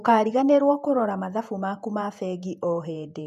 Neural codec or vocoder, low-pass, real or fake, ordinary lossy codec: vocoder, 48 kHz, 128 mel bands, Vocos; 14.4 kHz; fake; none